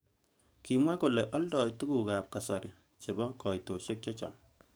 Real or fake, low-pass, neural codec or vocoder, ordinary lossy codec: fake; none; codec, 44.1 kHz, 7.8 kbps, DAC; none